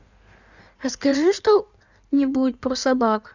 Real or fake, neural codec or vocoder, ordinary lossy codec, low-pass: fake; codec, 16 kHz in and 24 kHz out, 1.1 kbps, FireRedTTS-2 codec; none; 7.2 kHz